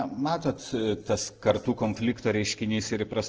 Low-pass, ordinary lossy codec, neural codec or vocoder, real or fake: 7.2 kHz; Opus, 16 kbps; none; real